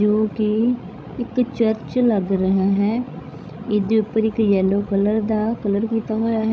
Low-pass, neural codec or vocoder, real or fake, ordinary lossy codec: none; codec, 16 kHz, 16 kbps, FreqCodec, larger model; fake; none